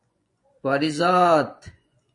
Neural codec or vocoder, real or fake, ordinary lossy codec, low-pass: vocoder, 24 kHz, 100 mel bands, Vocos; fake; MP3, 48 kbps; 10.8 kHz